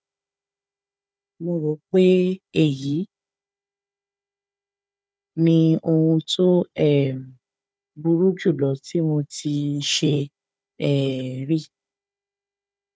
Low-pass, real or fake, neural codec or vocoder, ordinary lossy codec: none; fake; codec, 16 kHz, 4 kbps, FunCodec, trained on Chinese and English, 50 frames a second; none